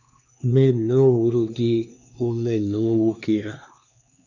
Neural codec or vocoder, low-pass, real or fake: codec, 16 kHz, 2 kbps, X-Codec, HuBERT features, trained on LibriSpeech; 7.2 kHz; fake